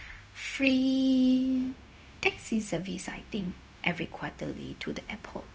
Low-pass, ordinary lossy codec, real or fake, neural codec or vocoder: none; none; fake; codec, 16 kHz, 0.4 kbps, LongCat-Audio-Codec